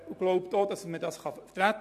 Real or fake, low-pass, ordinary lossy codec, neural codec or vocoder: real; 14.4 kHz; AAC, 96 kbps; none